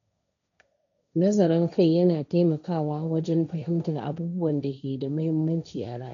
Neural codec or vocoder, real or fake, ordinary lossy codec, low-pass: codec, 16 kHz, 1.1 kbps, Voila-Tokenizer; fake; none; 7.2 kHz